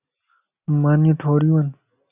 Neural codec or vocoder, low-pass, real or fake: none; 3.6 kHz; real